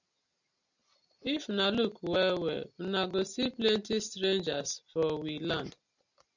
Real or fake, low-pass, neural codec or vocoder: real; 7.2 kHz; none